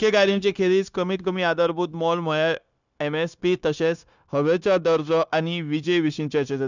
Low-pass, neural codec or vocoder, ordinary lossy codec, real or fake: 7.2 kHz; codec, 16 kHz, 0.9 kbps, LongCat-Audio-Codec; none; fake